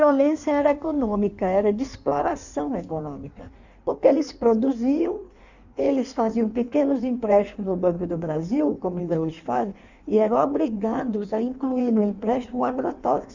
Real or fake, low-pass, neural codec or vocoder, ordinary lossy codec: fake; 7.2 kHz; codec, 16 kHz in and 24 kHz out, 1.1 kbps, FireRedTTS-2 codec; none